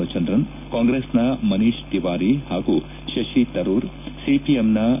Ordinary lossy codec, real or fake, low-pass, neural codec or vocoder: none; real; 3.6 kHz; none